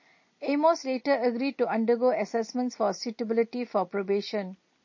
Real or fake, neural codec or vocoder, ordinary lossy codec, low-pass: real; none; MP3, 32 kbps; 7.2 kHz